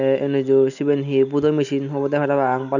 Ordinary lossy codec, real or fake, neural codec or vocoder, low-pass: none; real; none; 7.2 kHz